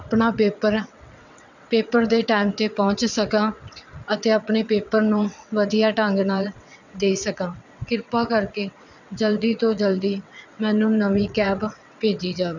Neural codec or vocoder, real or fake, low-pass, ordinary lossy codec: vocoder, 22.05 kHz, 80 mel bands, WaveNeXt; fake; 7.2 kHz; none